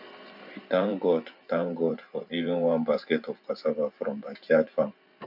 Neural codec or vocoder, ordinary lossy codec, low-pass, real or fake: vocoder, 44.1 kHz, 128 mel bands every 512 samples, BigVGAN v2; none; 5.4 kHz; fake